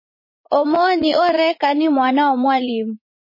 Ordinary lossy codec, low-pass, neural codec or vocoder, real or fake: MP3, 24 kbps; 5.4 kHz; vocoder, 44.1 kHz, 80 mel bands, Vocos; fake